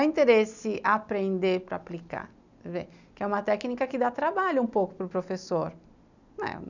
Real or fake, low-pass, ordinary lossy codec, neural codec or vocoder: real; 7.2 kHz; none; none